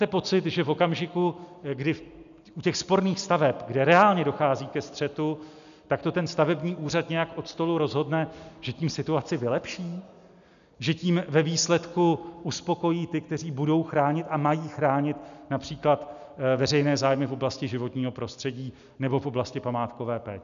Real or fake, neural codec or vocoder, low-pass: real; none; 7.2 kHz